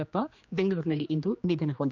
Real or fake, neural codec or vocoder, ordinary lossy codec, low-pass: fake; codec, 16 kHz, 2 kbps, X-Codec, HuBERT features, trained on general audio; none; 7.2 kHz